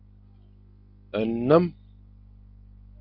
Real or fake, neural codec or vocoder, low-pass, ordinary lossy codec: real; none; 5.4 kHz; Opus, 32 kbps